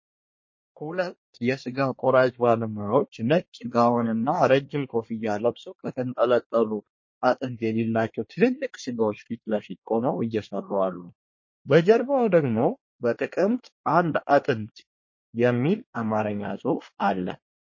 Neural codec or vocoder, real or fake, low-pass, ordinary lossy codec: codec, 24 kHz, 1 kbps, SNAC; fake; 7.2 kHz; MP3, 32 kbps